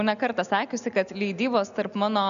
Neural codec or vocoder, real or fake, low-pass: none; real; 7.2 kHz